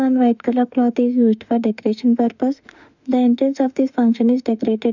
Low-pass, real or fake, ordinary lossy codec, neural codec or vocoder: 7.2 kHz; fake; none; autoencoder, 48 kHz, 32 numbers a frame, DAC-VAE, trained on Japanese speech